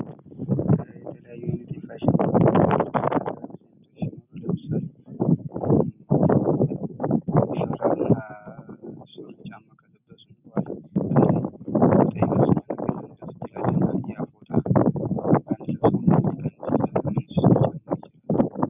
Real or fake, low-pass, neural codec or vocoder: real; 3.6 kHz; none